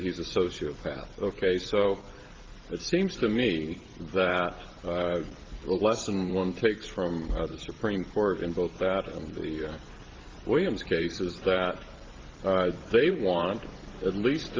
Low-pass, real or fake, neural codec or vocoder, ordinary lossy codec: 7.2 kHz; fake; vocoder, 44.1 kHz, 128 mel bands every 512 samples, BigVGAN v2; Opus, 16 kbps